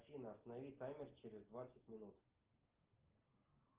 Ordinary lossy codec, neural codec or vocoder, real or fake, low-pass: Opus, 16 kbps; none; real; 3.6 kHz